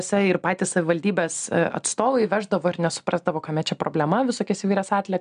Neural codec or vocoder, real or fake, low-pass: none; real; 9.9 kHz